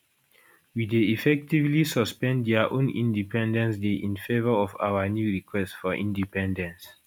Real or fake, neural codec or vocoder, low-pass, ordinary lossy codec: real; none; 19.8 kHz; none